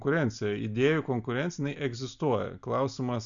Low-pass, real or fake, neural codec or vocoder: 7.2 kHz; real; none